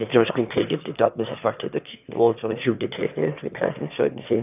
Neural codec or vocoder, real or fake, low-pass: autoencoder, 22.05 kHz, a latent of 192 numbers a frame, VITS, trained on one speaker; fake; 3.6 kHz